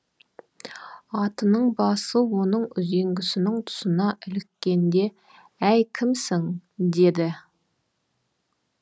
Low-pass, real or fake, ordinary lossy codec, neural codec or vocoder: none; real; none; none